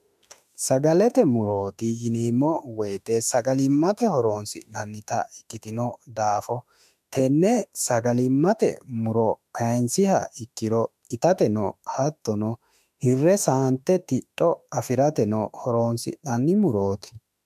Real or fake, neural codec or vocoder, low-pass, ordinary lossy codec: fake; autoencoder, 48 kHz, 32 numbers a frame, DAC-VAE, trained on Japanese speech; 14.4 kHz; MP3, 96 kbps